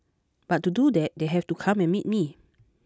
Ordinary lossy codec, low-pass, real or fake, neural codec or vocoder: none; none; real; none